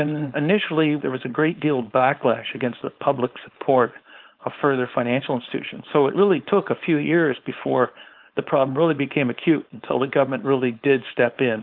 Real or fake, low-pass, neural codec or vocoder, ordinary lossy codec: fake; 5.4 kHz; codec, 16 kHz, 4.8 kbps, FACodec; Opus, 32 kbps